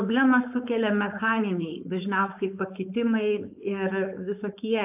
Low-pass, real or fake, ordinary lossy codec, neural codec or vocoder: 3.6 kHz; fake; AAC, 32 kbps; codec, 16 kHz, 4.8 kbps, FACodec